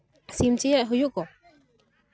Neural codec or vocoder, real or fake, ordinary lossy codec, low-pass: none; real; none; none